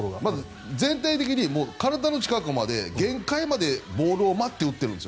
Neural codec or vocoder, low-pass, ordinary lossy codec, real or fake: none; none; none; real